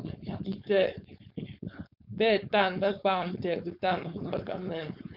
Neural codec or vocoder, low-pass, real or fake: codec, 16 kHz, 4.8 kbps, FACodec; 5.4 kHz; fake